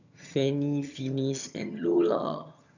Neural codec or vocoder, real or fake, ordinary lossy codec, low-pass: vocoder, 22.05 kHz, 80 mel bands, HiFi-GAN; fake; none; 7.2 kHz